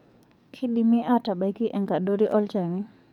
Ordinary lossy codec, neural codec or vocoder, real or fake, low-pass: none; codec, 44.1 kHz, 7.8 kbps, DAC; fake; 19.8 kHz